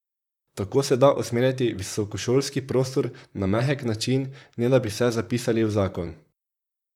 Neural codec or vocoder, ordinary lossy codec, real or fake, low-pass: vocoder, 44.1 kHz, 128 mel bands, Pupu-Vocoder; none; fake; 19.8 kHz